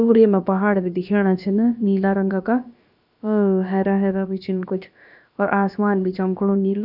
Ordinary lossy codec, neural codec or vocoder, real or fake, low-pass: none; codec, 16 kHz, about 1 kbps, DyCAST, with the encoder's durations; fake; 5.4 kHz